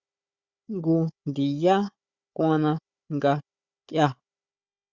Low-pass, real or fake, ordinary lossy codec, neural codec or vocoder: 7.2 kHz; fake; Opus, 64 kbps; codec, 16 kHz, 4 kbps, FunCodec, trained on Chinese and English, 50 frames a second